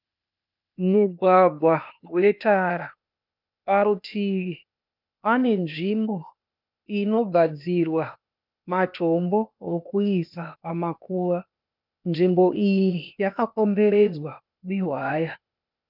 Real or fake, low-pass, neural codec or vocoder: fake; 5.4 kHz; codec, 16 kHz, 0.8 kbps, ZipCodec